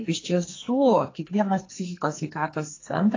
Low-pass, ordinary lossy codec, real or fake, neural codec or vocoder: 7.2 kHz; AAC, 32 kbps; fake; codec, 44.1 kHz, 2.6 kbps, SNAC